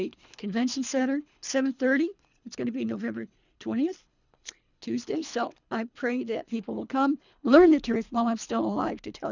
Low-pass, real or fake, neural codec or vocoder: 7.2 kHz; fake; codec, 24 kHz, 3 kbps, HILCodec